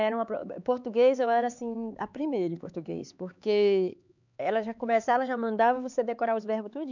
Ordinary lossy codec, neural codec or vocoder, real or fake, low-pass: none; codec, 16 kHz, 4 kbps, X-Codec, HuBERT features, trained on LibriSpeech; fake; 7.2 kHz